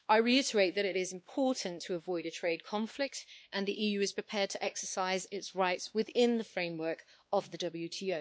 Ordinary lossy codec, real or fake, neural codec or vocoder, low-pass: none; fake; codec, 16 kHz, 2 kbps, X-Codec, WavLM features, trained on Multilingual LibriSpeech; none